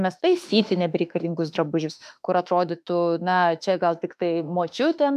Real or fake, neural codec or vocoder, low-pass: fake; autoencoder, 48 kHz, 32 numbers a frame, DAC-VAE, trained on Japanese speech; 14.4 kHz